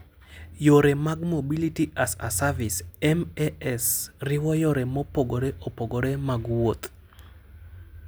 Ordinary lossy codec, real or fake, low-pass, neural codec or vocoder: none; real; none; none